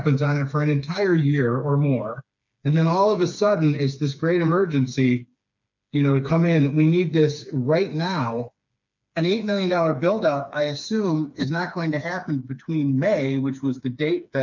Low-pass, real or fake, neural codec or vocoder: 7.2 kHz; fake; codec, 16 kHz, 4 kbps, FreqCodec, smaller model